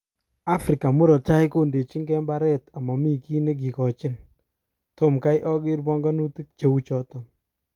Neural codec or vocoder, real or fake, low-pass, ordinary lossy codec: none; real; 19.8 kHz; Opus, 32 kbps